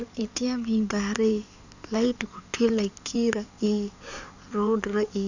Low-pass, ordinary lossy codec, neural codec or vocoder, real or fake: 7.2 kHz; none; codec, 16 kHz in and 24 kHz out, 2.2 kbps, FireRedTTS-2 codec; fake